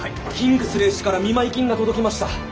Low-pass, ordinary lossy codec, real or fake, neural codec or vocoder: none; none; real; none